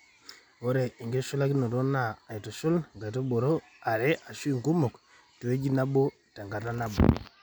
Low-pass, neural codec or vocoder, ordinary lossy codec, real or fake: none; none; none; real